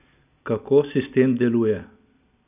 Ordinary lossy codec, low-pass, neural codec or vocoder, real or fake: none; 3.6 kHz; none; real